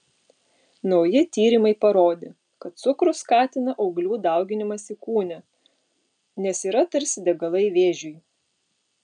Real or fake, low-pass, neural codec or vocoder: real; 9.9 kHz; none